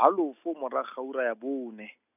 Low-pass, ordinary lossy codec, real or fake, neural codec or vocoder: 3.6 kHz; none; real; none